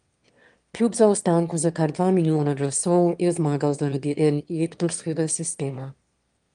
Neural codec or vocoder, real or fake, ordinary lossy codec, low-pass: autoencoder, 22.05 kHz, a latent of 192 numbers a frame, VITS, trained on one speaker; fake; Opus, 32 kbps; 9.9 kHz